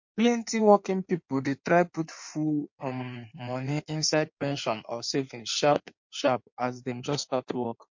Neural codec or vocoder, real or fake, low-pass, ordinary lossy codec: codec, 16 kHz in and 24 kHz out, 1.1 kbps, FireRedTTS-2 codec; fake; 7.2 kHz; MP3, 48 kbps